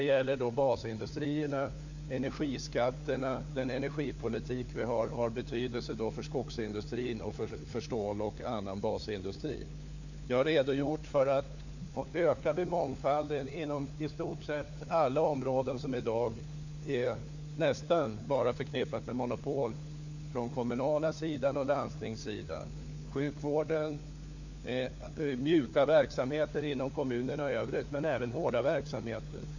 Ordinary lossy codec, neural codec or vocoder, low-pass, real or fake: none; codec, 16 kHz, 4 kbps, FunCodec, trained on LibriTTS, 50 frames a second; 7.2 kHz; fake